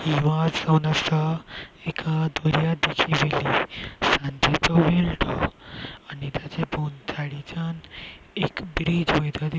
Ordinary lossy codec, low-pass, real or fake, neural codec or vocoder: none; none; real; none